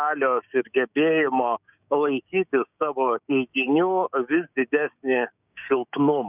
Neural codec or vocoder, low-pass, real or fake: codec, 16 kHz, 6 kbps, DAC; 3.6 kHz; fake